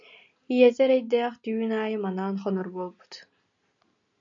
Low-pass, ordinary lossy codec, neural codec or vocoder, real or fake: 7.2 kHz; MP3, 96 kbps; none; real